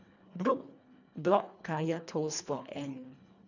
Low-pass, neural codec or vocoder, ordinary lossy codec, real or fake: 7.2 kHz; codec, 24 kHz, 1.5 kbps, HILCodec; none; fake